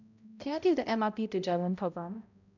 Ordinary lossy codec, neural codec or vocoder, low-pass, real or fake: none; codec, 16 kHz, 0.5 kbps, X-Codec, HuBERT features, trained on balanced general audio; 7.2 kHz; fake